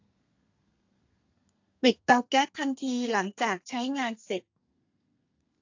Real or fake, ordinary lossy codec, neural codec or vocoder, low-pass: fake; AAC, 48 kbps; codec, 32 kHz, 1.9 kbps, SNAC; 7.2 kHz